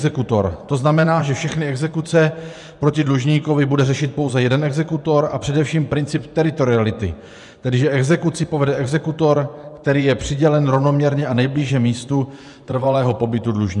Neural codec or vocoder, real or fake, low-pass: vocoder, 24 kHz, 100 mel bands, Vocos; fake; 10.8 kHz